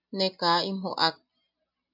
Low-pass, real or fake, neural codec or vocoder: 5.4 kHz; real; none